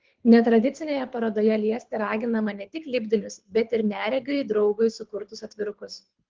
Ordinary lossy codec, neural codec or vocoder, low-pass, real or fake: Opus, 16 kbps; codec, 24 kHz, 6 kbps, HILCodec; 7.2 kHz; fake